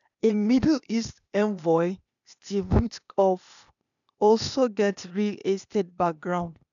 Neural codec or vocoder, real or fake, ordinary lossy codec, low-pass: codec, 16 kHz, 0.8 kbps, ZipCodec; fake; none; 7.2 kHz